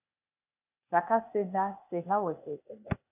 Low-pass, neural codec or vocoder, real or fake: 3.6 kHz; codec, 16 kHz, 0.8 kbps, ZipCodec; fake